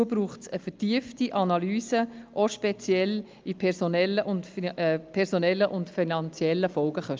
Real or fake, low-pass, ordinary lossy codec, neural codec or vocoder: real; 7.2 kHz; Opus, 24 kbps; none